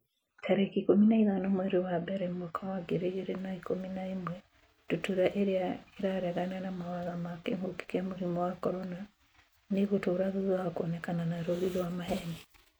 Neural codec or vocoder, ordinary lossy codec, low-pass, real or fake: none; none; none; real